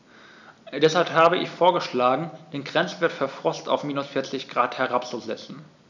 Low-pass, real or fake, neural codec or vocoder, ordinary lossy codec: 7.2 kHz; real; none; none